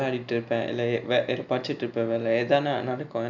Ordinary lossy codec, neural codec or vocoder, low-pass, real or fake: none; none; 7.2 kHz; real